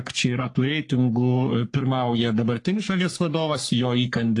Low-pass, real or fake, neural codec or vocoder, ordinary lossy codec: 10.8 kHz; fake; codec, 44.1 kHz, 3.4 kbps, Pupu-Codec; AAC, 48 kbps